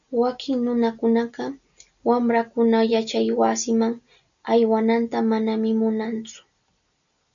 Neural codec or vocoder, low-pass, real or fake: none; 7.2 kHz; real